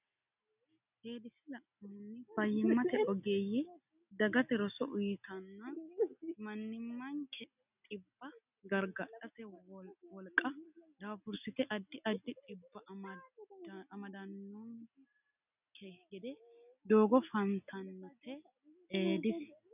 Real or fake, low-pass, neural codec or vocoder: real; 3.6 kHz; none